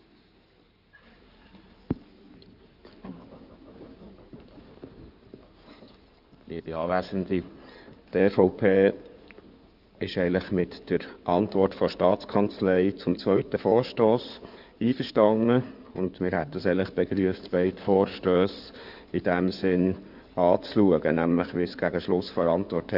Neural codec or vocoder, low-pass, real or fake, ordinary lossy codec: codec, 16 kHz in and 24 kHz out, 2.2 kbps, FireRedTTS-2 codec; 5.4 kHz; fake; none